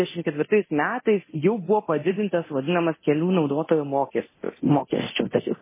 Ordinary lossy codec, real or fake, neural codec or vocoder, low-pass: MP3, 16 kbps; fake; codec, 24 kHz, 0.9 kbps, DualCodec; 3.6 kHz